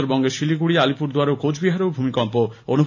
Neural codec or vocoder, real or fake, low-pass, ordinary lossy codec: none; real; 7.2 kHz; none